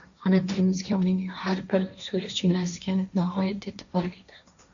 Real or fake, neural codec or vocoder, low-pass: fake; codec, 16 kHz, 1.1 kbps, Voila-Tokenizer; 7.2 kHz